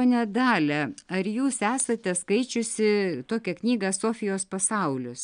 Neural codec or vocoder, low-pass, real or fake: none; 9.9 kHz; real